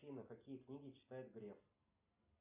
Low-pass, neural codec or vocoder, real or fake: 3.6 kHz; none; real